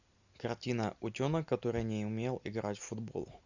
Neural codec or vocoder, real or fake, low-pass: none; real; 7.2 kHz